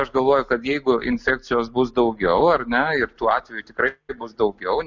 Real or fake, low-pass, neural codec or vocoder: real; 7.2 kHz; none